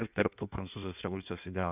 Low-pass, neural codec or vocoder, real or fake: 3.6 kHz; codec, 16 kHz in and 24 kHz out, 1.1 kbps, FireRedTTS-2 codec; fake